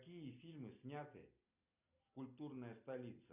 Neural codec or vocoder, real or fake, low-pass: none; real; 3.6 kHz